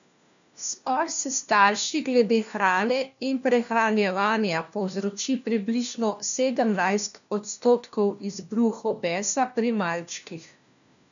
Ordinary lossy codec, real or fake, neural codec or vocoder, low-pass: none; fake; codec, 16 kHz, 1 kbps, FunCodec, trained on LibriTTS, 50 frames a second; 7.2 kHz